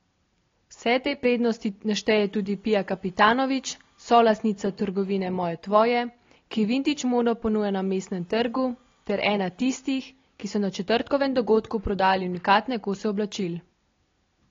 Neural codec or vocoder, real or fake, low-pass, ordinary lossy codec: none; real; 7.2 kHz; AAC, 32 kbps